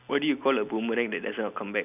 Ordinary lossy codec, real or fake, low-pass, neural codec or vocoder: none; real; 3.6 kHz; none